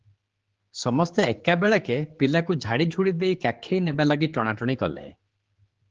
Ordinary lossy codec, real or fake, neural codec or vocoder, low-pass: Opus, 16 kbps; fake; codec, 16 kHz, 4 kbps, X-Codec, HuBERT features, trained on general audio; 7.2 kHz